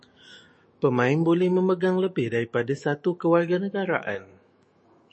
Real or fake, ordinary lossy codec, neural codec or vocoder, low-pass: fake; MP3, 32 kbps; autoencoder, 48 kHz, 128 numbers a frame, DAC-VAE, trained on Japanese speech; 9.9 kHz